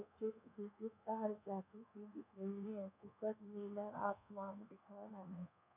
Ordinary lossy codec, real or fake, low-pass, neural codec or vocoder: none; fake; 3.6 kHz; codec, 24 kHz, 1.2 kbps, DualCodec